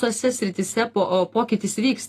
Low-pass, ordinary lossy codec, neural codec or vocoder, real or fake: 14.4 kHz; AAC, 48 kbps; none; real